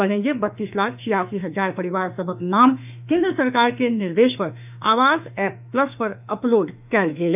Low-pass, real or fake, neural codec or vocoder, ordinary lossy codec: 3.6 kHz; fake; autoencoder, 48 kHz, 32 numbers a frame, DAC-VAE, trained on Japanese speech; none